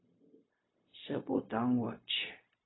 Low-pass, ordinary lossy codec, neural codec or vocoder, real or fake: 7.2 kHz; AAC, 16 kbps; codec, 16 kHz, 0.4 kbps, LongCat-Audio-Codec; fake